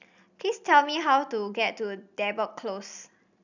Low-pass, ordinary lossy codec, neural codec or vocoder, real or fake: 7.2 kHz; none; none; real